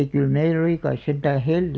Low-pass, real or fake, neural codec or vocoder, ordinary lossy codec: none; real; none; none